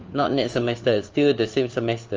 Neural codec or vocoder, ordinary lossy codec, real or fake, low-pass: codec, 16 kHz, 4 kbps, FunCodec, trained on LibriTTS, 50 frames a second; Opus, 24 kbps; fake; 7.2 kHz